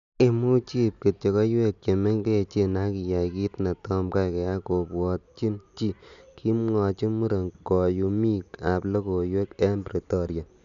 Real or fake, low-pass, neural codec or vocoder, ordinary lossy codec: real; 7.2 kHz; none; none